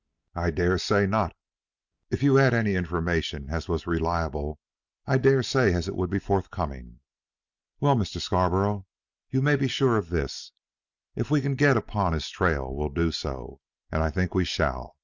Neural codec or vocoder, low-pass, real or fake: none; 7.2 kHz; real